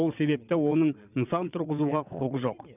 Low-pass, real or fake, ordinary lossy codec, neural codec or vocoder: 3.6 kHz; fake; none; vocoder, 22.05 kHz, 80 mel bands, WaveNeXt